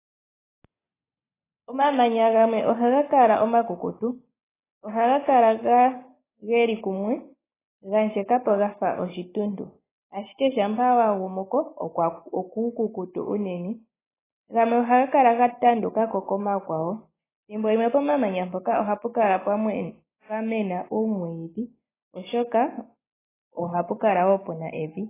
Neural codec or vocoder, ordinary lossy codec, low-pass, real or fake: none; AAC, 16 kbps; 3.6 kHz; real